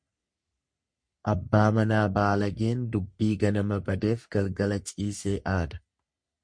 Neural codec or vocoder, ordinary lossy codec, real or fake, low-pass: codec, 44.1 kHz, 3.4 kbps, Pupu-Codec; MP3, 48 kbps; fake; 9.9 kHz